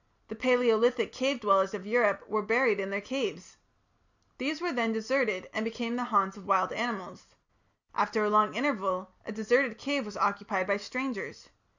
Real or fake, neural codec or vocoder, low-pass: real; none; 7.2 kHz